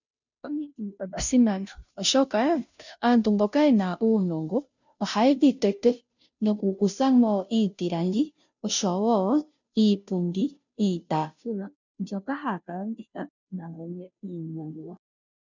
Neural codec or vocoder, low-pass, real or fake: codec, 16 kHz, 0.5 kbps, FunCodec, trained on Chinese and English, 25 frames a second; 7.2 kHz; fake